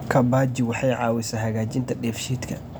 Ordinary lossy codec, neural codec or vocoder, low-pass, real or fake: none; none; none; real